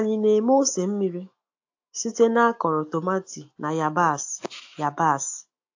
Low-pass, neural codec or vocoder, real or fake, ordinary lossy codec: 7.2 kHz; autoencoder, 48 kHz, 128 numbers a frame, DAC-VAE, trained on Japanese speech; fake; AAC, 48 kbps